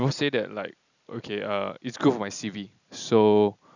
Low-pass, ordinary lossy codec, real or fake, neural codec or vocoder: 7.2 kHz; none; real; none